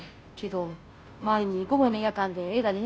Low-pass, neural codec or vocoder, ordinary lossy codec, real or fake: none; codec, 16 kHz, 0.5 kbps, FunCodec, trained on Chinese and English, 25 frames a second; none; fake